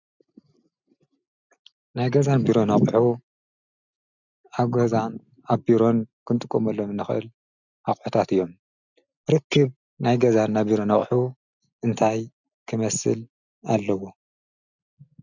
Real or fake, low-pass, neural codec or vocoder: real; 7.2 kHz; none